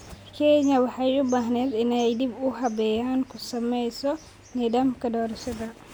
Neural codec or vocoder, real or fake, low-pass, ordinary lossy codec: none; real; none; none